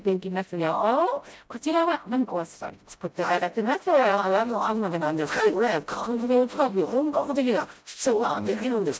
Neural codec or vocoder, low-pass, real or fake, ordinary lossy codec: codec, 16 kHz, 0.5 kbps, FreqCodec, smaller model; none; fake; none